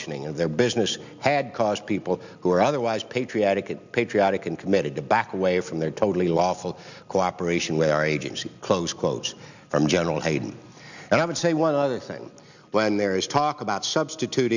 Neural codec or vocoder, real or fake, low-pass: none; real; 7.2 kHz